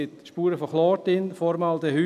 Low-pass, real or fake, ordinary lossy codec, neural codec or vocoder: 14.4 kHz; real; none; none